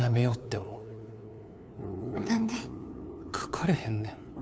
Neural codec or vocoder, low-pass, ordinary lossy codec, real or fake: codec, 16 kHz, 2 kbps, FunCodec, trained on LibriTTS, 25 frames a second; none; none; fake